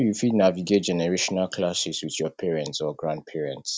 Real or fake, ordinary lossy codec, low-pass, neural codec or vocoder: real; none; none; none